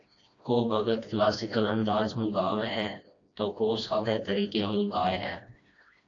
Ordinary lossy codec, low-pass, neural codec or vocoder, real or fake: AAC, 48 kbps; 7.2 kHz; codec, 16 kHz, 1 kbps, FreqCodec, smaller model; fake